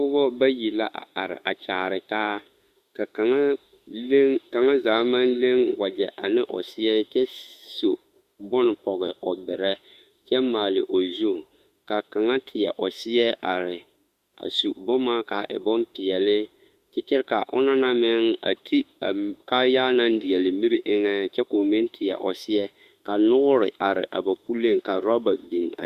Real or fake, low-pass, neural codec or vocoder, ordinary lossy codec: fake; 14.4 kHz; autoencoder, 48 kHz, 32 numbers a frame, DAC-VAE, trained on Japanese speech; Opus, 64 kbps